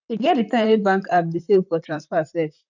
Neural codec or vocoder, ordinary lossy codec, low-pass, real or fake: vocoder, 44.1 kHz, 128 mel bands, Pupu-Vocoder; none; 7.2 kHz; fake